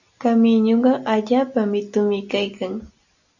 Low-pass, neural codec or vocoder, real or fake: 7.2 kHz; none; real